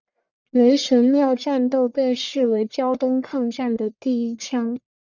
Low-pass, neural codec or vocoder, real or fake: 7.2 kHz; codec, 44.1 kHz, 1.7 kbps, Pupu-Codec; fake